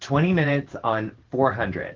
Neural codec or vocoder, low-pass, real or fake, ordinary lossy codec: vocoder, 44.1 kHz, 128 mel bands every 512 samples, BigVGAN v2; 7.2 kHz; fake; Opus, 16 kbps